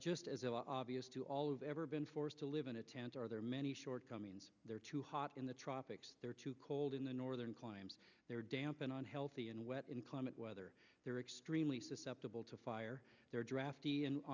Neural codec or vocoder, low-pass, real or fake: none; 7.2 kHz; real